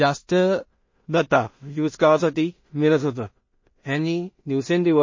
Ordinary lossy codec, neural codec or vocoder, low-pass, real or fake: MP3, 32 kbps; codec, 16 kHz in and 24 kHz out, 0.4 kbps, LongCat-Audio-Codec, two codebook decoder; 7.2 kHz; fake